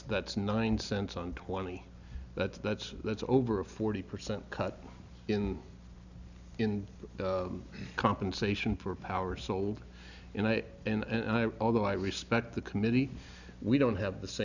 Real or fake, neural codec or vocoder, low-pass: real; none; 7.2 kHz